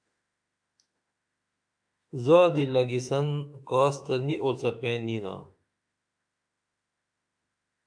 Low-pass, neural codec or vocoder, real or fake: 9.9 kHz; autoencoder, 48 kHz, 32 numbers a frame, DAC-VAE, trained on Japanese speech; fake